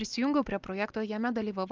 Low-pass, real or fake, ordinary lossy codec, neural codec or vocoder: 7.2 kHz; real; Opus, 32 kbps; none